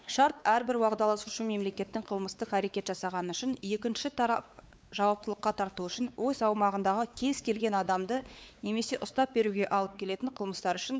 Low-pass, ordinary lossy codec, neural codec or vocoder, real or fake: none; none; codec, 16 kHz, 4 kbps, X-Codec, WavLM features, trained on Multilingual LibriSpeech; fake